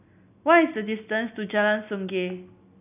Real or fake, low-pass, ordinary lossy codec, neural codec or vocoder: real; 3.6 kHz; none; none